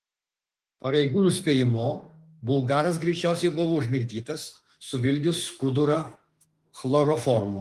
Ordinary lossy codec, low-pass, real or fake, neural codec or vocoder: Opus, 24 kbps; 14.4 kHz; fake; autoencoder, 48 kHz, 32 numbers a frame, DAC-VAE, trained on Japanese speech